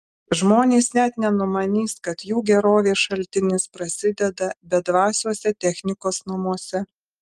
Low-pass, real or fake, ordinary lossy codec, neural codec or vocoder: 14.4 kHz; real; Opus, 32 kbps; none